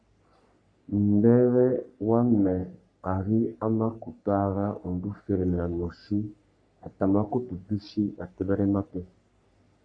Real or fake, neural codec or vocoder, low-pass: fake; codec, 44.1 kHz, 3.4 kbps, Pupu-Codec; 9.9 kHz